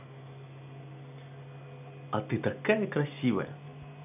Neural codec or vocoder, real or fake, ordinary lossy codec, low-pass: none; real; none; 3.6 kHz